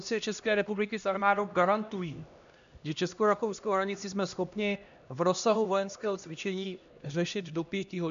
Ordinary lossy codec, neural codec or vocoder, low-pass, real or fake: AAC, 96 kbps; codec, 16 kHz, 1 kbps, X-Codec, HuBERT features, trained on LibriSpeech; 7.2 kHz; fake